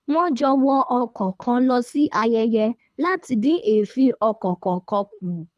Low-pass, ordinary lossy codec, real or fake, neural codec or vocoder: none; none; fake; codec, 24 kHz, 3 kbps, HILCodec